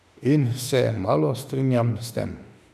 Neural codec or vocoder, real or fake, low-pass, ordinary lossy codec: autoencoder, 48 kHz, 32 numbers a frame, DAC-VAE, trained on Japanese speech; fake; 14.4 kHz; none